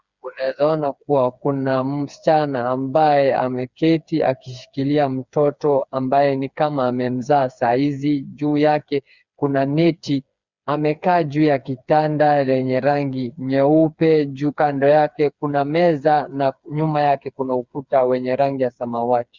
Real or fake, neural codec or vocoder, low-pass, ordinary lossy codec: fake; codec, 16 kHz, 4 kbps, FreqCodec, smaller model; 7.2 kHz; Opus, 64 kbps